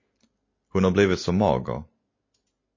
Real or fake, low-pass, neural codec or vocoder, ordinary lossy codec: real; 7.2 kHz; none; MP3, 32 kbps